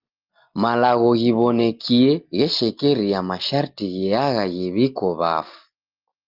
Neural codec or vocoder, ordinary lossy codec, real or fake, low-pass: none; Opus, 32 kbps; real; 5.4 kHz